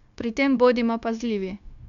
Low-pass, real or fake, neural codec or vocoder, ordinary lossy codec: 7.2 kHz; fake; codec, 16 kHz, 0.9 kbps, LongCat-Audio-Codec; none